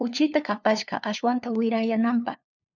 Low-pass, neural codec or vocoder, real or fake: 7.2 kHz; codec, 16 kHz, 2 kbps, FunCodec, trained on LibriTTS, 25 frames a second; fake